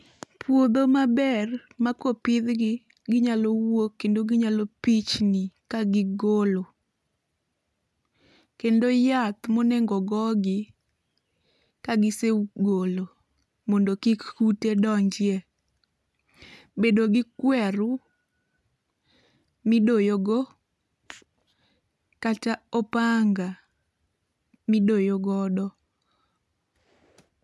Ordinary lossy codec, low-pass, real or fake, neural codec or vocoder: none; none; real; none